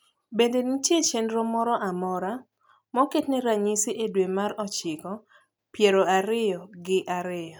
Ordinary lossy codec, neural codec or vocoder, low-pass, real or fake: none; none; none; real